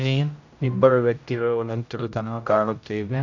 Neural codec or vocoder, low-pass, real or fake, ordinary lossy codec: codec, 16 kHz, 0.5 kbps, X-Codec, HuBERT features, trained on general audio; 7.2 kHz; fake; none